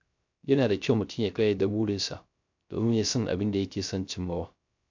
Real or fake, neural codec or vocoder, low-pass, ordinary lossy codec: fake; codec, 16 kHz, 0.3 kbps, FocalCodec; 7.2 kHz; MP3, 64 kbps